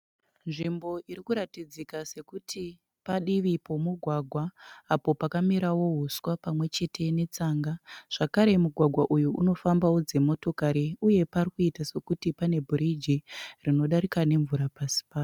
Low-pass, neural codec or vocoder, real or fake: 19.8 kHz; none; real